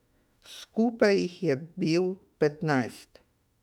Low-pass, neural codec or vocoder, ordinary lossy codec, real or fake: 19.8 kHz; autoencoder, 48 kHz, 32 numbers a frame, DAC-VAE, trained on Japanese speech; none; fake